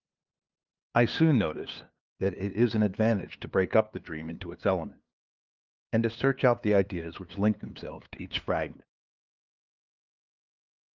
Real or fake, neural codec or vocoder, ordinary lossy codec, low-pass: fake; codec, 16 kHz, 2 kbps, FunCodec, trained on LibriTTS, 25 frames a second; Opus, 32 kbps; 7.2 kHz